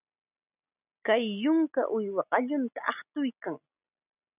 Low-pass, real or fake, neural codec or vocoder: 3.6 kHz; real; none